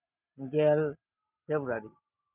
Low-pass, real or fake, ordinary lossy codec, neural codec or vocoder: 3.6 kHz; real; none; none